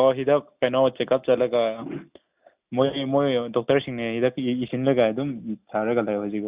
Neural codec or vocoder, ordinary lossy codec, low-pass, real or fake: none; Opus, 64 kbps; 3.6 kHz; real